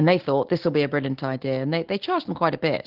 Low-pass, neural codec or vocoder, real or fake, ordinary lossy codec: 5.4 kHz; none; real; Opus, 16 kbps